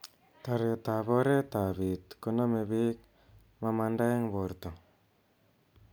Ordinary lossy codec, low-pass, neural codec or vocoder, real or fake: none; none; none; real